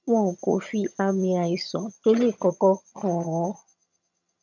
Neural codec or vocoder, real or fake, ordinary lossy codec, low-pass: vocoder, 22.05 kHz, 80 mel bands, HiFi-GAN; fake; none; 7.2 kHz